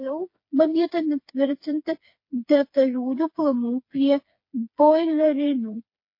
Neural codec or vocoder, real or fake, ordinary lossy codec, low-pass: codec, 16 kHz, 4 kbps, FreqCodec, smaller model; fake; MP3, 32 kbps; 5.4 kHz